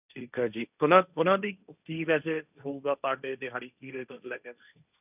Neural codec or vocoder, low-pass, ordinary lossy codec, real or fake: codec, 16 kHz, 1.1 kbps, Voila-Tokenizer; 3.6 kHz; none; fake